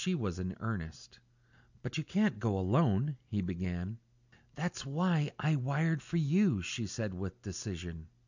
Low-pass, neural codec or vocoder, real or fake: 7.2 kHz; none; real